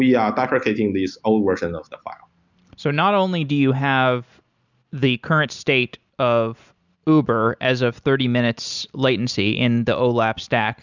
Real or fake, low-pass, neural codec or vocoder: real; 7.2 kHz; none